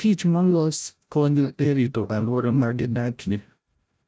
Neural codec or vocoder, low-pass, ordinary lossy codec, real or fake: codec, 16 kHz, 0.5 kbps, FreqCodec, larger model; none; none; fake